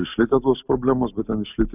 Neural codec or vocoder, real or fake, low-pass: none; real; 3.6 kHz